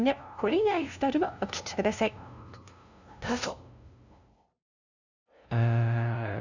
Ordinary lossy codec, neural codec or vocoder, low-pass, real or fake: none; codec, 16 kHz, 0.5 kbps, FunCodec, trained on LibriTTS, 25 frames a second; 7.2 kHz; fake